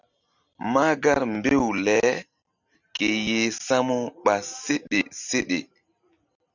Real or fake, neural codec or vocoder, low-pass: real; none; 7.2 kHz